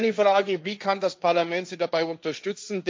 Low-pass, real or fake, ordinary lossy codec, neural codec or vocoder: none; fake; none; codec, 16 kHz, 1.1 kbps, Voila-Tokenizer